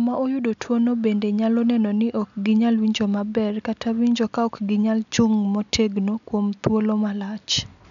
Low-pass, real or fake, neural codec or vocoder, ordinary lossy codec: 7.2 kHz; real; none; none